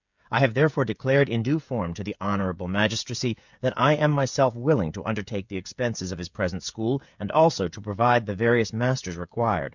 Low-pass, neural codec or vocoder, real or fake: 7.2 kHz; codec, 16 kHz, 16 kbps, FreqCodec, smaller model; fake